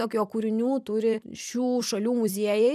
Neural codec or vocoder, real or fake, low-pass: vocoder, 44.1 kHz, 128 mel bands every 256 samples, BigVGAN v2; fake; 14.4 kHz